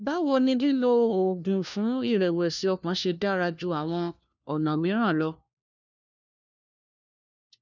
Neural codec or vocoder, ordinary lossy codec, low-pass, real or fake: codec, 16 kHz, 1 kbps, FunCodec, trained on LibriTTS, 50 frames a second; none; 7.2 kHz; fake